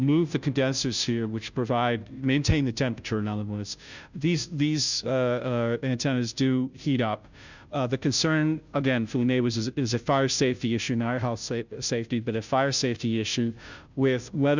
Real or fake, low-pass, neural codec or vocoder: fake; 7.2 kHz; codec, 16 kHz, 0.5 kbps, FunCodec, trained on Chinese and English, 25 frames a second